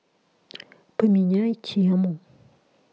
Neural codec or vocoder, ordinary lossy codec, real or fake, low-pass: none; none; real; none